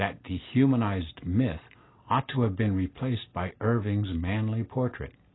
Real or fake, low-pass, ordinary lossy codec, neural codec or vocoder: real; 7.2 kHz; AAC, 16 kbps; none